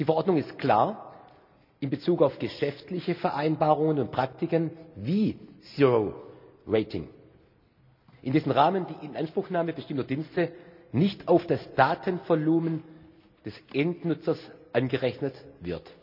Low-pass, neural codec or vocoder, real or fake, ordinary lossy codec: 5.4 kHz; none; real; none